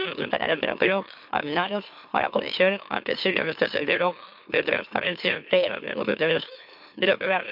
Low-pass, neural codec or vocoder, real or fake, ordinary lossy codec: 5.4 kHz; autoencoder, 44.1 kHz, a latent of 192 numbers a frame, MeloTTS; fake; MP3, 48 kbps